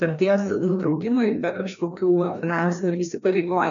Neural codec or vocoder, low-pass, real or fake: codec, 16 kHz, 1 kbps, FreqCodec, larger model; 7.2 kHz; fake